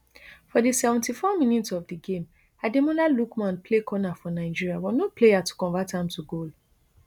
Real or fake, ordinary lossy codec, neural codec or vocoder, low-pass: real; none; none; 19.8 kHz